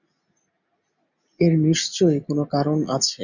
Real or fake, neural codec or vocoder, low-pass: real; none; 7.2 kHz